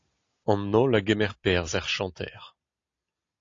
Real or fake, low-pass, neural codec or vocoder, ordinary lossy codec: real; 7.2 kHz; none; AAC, 48 kbps